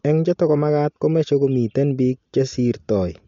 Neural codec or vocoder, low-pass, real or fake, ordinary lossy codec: none; 7.2 kHz; real; MP3, 48 kbps